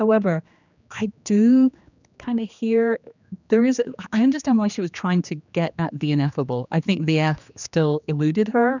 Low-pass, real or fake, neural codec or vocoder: 7.2 kHz; fake; codec, 16 kHz, 2 kbps, X-Codec, HuBERT features, trained on general audio